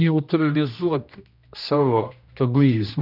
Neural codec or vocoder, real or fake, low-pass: codec, 16 kHz, 1 kbps, X-Codec, HuBERT features, trained on general audio; fake; 5.4 kHz